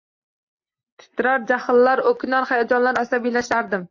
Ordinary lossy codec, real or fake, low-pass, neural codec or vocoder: AAC, 48 kbps; real; 7.2 kHz; none